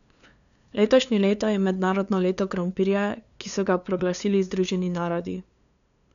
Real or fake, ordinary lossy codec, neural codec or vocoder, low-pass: fake; none; codec, 16 kHz, 2 kbps, FunCodec, trained on LibriTTS, 25 frames a second; 7.2 kHz